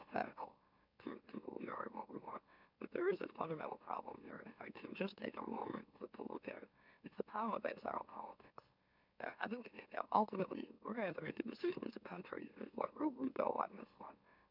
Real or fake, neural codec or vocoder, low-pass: fake; autoencoder, 44.1 kHz, a latent of 192 numbers a frame, MeloTTS; 5.4 kHz